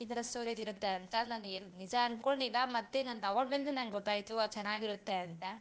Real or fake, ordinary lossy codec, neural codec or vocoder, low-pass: fake; none; codec, 16 kHz, 0.8 kbps, ZipCodec; none